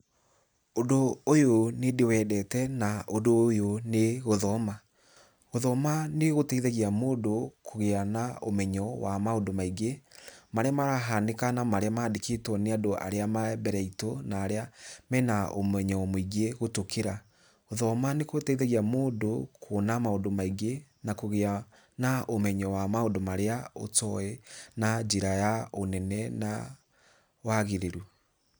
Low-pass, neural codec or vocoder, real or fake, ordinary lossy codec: none; none; real; none